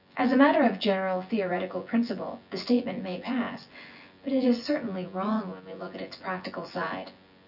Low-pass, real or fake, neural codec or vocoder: 5.4 kHz; fake; vocoder, 24 kHz, 100 mel bands, Vocos